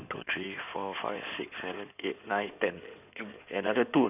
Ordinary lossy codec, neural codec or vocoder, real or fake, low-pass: none; codec, 16 kHz in and 24 kHz out, 2.2 kbps, FireRedTTS-2 codec; fake; 3.6 kHz